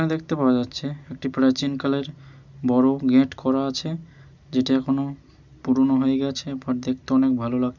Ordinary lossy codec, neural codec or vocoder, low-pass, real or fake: none; none; 7.2 kHz; real